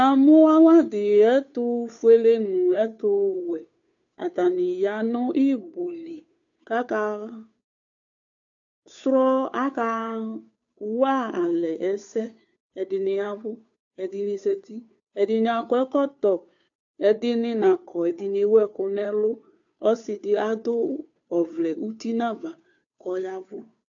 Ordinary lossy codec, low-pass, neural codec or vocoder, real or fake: AAC, 64 kbps; 7.2 kHz; codec, 16 kHz, 2 kbps, FunCodec, trained on Chinese and English, 25 frames a second; fake